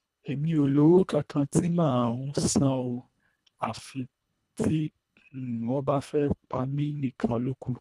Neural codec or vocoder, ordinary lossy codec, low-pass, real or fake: codec, 24 kHz, 1.5 kbps, HILCodec; none; none; fake